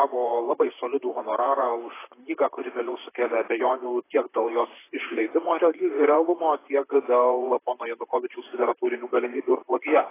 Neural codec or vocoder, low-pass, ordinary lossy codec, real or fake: vocoder, 44.1 kHz, 128 mel bands, Pupu-Vocoder; 3.6 kHz; AAC, 16 kbps; fake